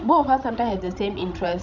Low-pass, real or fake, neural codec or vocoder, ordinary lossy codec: 7.2 kHz; fake; codec, 16 kHz, 16 kbps, FreqCodec, larger model; none